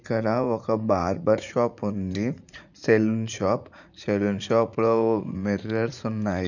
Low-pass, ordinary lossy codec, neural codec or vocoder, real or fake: 7.2 kHz; none; vocoder, 44.1 kHz, 80 mel bands, Vocos; fake